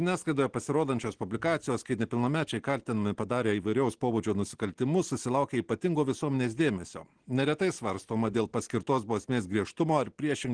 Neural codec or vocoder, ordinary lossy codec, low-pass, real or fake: none; Opus, 16 kbps; 9.9 kHz; real